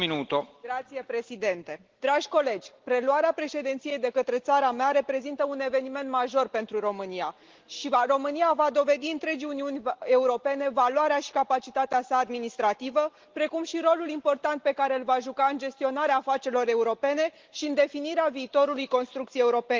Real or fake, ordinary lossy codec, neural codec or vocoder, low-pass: real; Opus, 16 kbps; none; 7.2 kHz